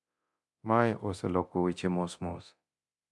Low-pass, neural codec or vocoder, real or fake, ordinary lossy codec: none; codec, 24 kHz, 0.9 kbps, DualCodec; fake; none